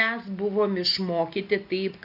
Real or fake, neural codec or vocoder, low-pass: real; none; 5.4 kHz